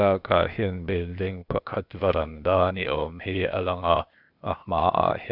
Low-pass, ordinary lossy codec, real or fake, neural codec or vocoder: 5.4 kHz; none; fake; codec, 16 kHz, 0.8 kbps, ZipCodec